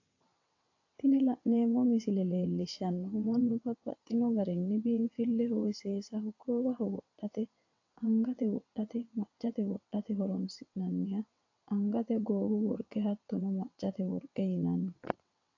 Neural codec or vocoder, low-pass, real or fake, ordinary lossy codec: vocoder, 22.05 kHz, 80 mel bands, WaveNeXt; 7.2 kHz; fake; AAC, 48 kbps